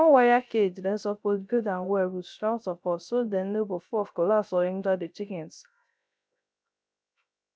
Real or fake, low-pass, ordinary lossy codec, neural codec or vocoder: fake; none; none; codec, 16 kHz, 0.3 kbps, FocalCodec